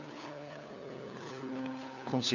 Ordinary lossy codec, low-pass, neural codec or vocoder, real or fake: none; 7.2 kHz; codec, 16 kHz, 4 kbps, FunCodec, trained on LibriTTS, 50 frames a second; fake